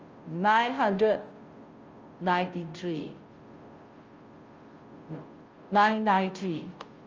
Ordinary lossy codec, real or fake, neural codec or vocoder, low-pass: Opus, 24 kbps; fake; codec, 16 kHz, 0.5 kbps, FunCodec, trained on Chinese and English, 25 frames a second; 7.2 kHz